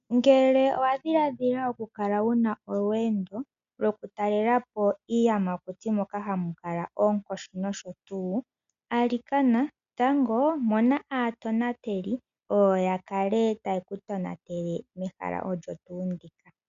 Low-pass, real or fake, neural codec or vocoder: 7.2 kHz; real; none